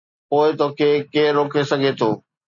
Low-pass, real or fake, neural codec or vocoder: 7.2 kHz; real; none